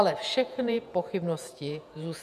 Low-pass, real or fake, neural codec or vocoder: 14.4 kHz; fake; vocoder, 48 kHz, 128 mel bands, Vocos